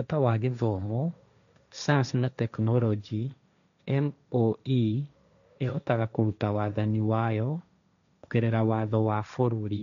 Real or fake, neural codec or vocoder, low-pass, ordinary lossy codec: fake; codec, 16 kHz, 1.1 kbps, Voila-Tokenizer; 7.2 kHz; none